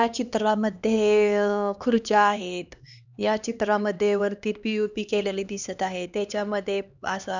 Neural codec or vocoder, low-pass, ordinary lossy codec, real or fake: codec, 16 kHz, 2 kbps, X-Codec, HuBERT features, trained on LibriSpeech; 7.2 kHz; none; fake